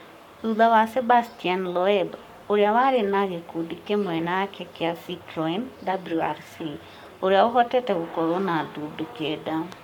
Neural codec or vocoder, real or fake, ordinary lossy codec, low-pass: codec, 44.1 kHz, 7.8 kbps, Pupu-Codec; fake; none; 19.8 kHz